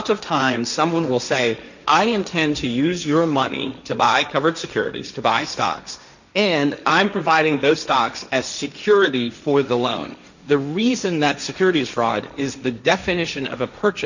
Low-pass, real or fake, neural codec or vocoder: 7.2 kHz; fake; codec, 16 kHz, 1.1 kbps, Voila-Tokenizer